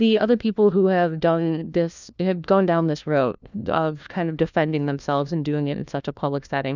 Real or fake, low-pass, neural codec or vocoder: fake; 7.2 kHz; codec, 16 kHz, 1 kbps, FunCodec, trained on LibriTTS, 50 frames a second